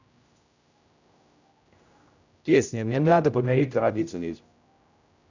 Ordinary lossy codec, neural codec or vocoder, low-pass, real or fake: none; codec, 16 kHz, 0.5 kbps, X-Codec, HuBERT features, trained on general audio; 7.2 kHz; fake